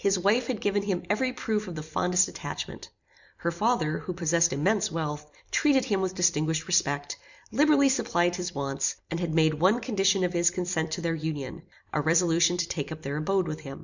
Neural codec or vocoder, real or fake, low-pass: vocoder, 44.1 kHz, 128 mel bands every 256 samples, BigVGAN v2; fake; 7.2 kHz